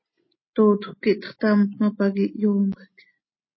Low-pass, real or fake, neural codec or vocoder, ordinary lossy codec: 7.2 kHz; real; none; MP3, 24 kbps